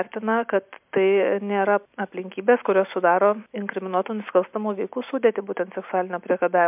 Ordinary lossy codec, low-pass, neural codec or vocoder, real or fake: MP3, 32 kbps; 3.6 kHz; none; real